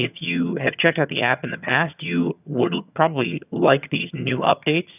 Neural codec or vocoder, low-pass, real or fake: vocoder, 22.05 kHz, 80 mel bands, HiFi-GAN; 3.6 kHz; fake